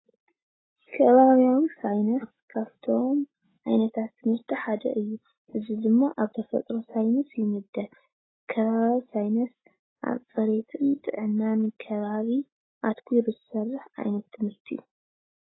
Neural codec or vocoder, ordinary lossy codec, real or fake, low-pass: none; AAC, 16 kbps; real; 7.2 kHz